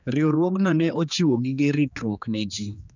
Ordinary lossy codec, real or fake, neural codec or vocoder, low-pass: none; fake; codec, 16 kHz, 2 kbps, X-Codec, HuBERT features, trained on general audio; 7.2 kHz